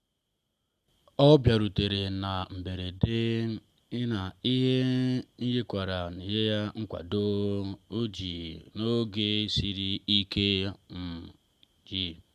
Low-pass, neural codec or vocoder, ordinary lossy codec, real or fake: 14.4 kHz; none; Opus, 64 kbps; real